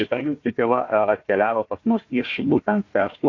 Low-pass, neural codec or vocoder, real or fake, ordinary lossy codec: 7.2 kHz; codec, 16 kHz, 1 kbps, FunCodec, trained on Chinese and English, 50 frames a second; fake; AAC, 48 kbps